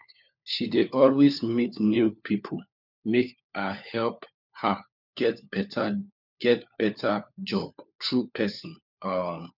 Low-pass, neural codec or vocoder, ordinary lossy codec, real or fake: 5.4 kHz; codec, 16 kHz, 4 kbps, FunCodec, trained on LibriTTS, 50 frames a second; none; fake